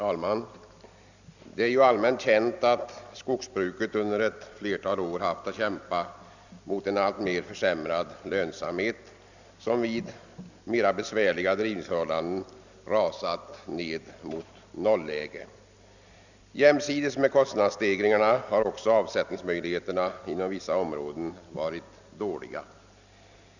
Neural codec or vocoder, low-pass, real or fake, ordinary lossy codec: none; 7.2 kHz; real; none